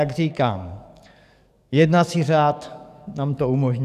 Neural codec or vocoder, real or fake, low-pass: codec, 44.1 kHz, 7.8 kbps, DAC; fake; 14.4 kHz